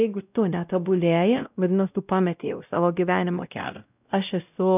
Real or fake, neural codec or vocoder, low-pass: fake; codec, 16 kHz, 0.5 kbps, X-Codec, WavLM features, trained on Multilingual LibriSpeech; 3.6 kHz